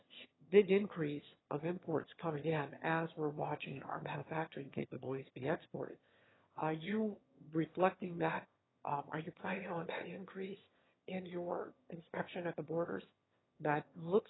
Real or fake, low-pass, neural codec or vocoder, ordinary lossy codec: fake; 7.2 kHz; autoencoder, 22.05 kHz, a latent of 192 numbers a frame, VITS, trained on one speaker; AAC, 16 kbps